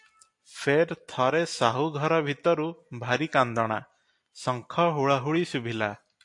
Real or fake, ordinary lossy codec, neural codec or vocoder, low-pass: real; AAC, 64 kbps; none; 10.8 kHz